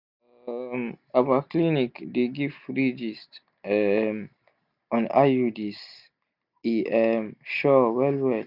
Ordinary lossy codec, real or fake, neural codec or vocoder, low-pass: none; real; none; 5.4 kHz